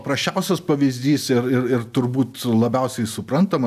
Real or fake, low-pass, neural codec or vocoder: real; 14.4 kHz; none